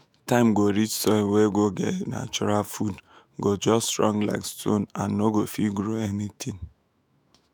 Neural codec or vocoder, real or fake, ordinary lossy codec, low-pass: autoencoder, 48 kHz, 128 numbers a frame, DAC-VAE, trained on Japanese speech; fake; none; none